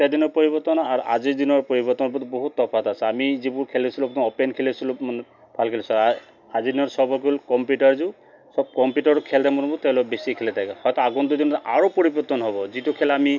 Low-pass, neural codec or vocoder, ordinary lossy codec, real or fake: 7.2 kHz; none; none; real